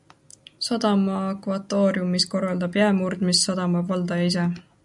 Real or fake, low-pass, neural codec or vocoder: real; 10.8 kHz; none